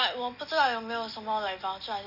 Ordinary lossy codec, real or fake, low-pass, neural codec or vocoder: none; real; 5.4 kHz; none